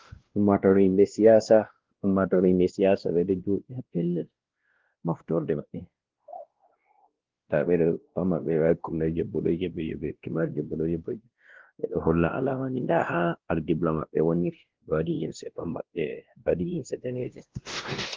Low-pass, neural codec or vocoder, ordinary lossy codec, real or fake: 7.2 kHz; codec, 16 kHz, 1 kbps, X-Codec, WavLM features, trained on Multilingual LibriSpeech; Opus, 16 kbps; fake